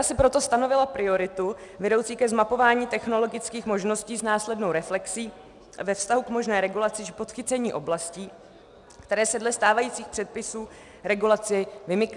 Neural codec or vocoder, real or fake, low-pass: none; real; 10.8 kHz